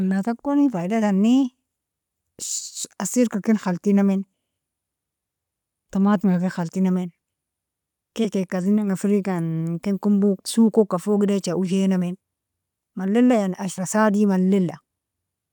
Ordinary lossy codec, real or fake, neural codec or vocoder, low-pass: none; real; none; 19.8 kHz